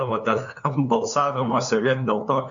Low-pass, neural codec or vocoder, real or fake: 7.2 kHz; codec, 16 kHz, 2 kbps, FunCodec, trained on LibriTTS, 25 frames a second; fake